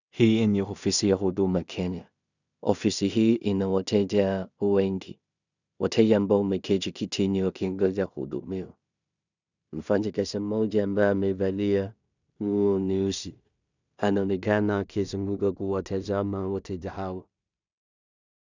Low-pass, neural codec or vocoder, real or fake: 7.2 kHz; codec, 16 kHz in and 24 kHz out, 0.4 kbps, LongCat-Audio-Codec, two codebook decoder; fake